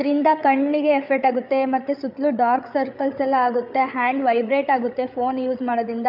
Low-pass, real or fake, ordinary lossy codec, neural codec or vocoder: 5.4 kHz; fake; none; codec, 16 kHz, 8 kbps, FreqCodec, larger model